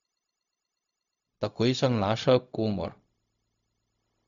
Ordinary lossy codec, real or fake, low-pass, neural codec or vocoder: none; fake; 7.2 kHz; codec, 16 kHz, 0.4 kbps, LongCat-Audio-Codec